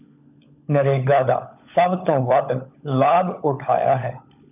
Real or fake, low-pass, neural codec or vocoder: fake; 3.6 kHz; codec, 16 kHz, 16 kbps, FunCodec, trained on LibriTTS, 50 frames a second